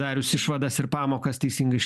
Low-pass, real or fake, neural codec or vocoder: 14.4 kHz; fake; vocoder, 44.1 kHz, 128 mel bands every 512 samples, BigVGAN v2